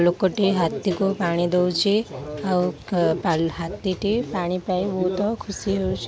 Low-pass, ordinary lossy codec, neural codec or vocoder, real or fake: none; none; none; real